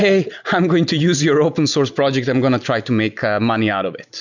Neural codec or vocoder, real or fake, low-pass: none; real; 7.2 kHz